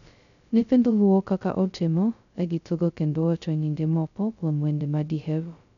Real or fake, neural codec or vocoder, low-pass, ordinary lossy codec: fake; codec, 16 kHz, 0.2 kbps, FocalCodec; 7.2 kHz; MP3, 96 kbps